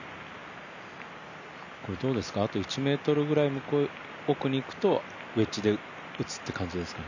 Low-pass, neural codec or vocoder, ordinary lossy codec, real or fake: 7.2 kHz; none; none; real